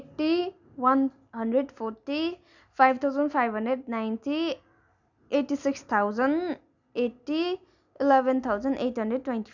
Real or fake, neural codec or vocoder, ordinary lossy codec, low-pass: real; none; none; 7.2 kHz